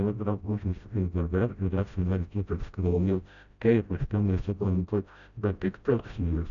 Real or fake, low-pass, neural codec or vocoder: fake; 7.2 kHz; codec, 16 kHz, 0.5 kbps, FreqCodec, smaller model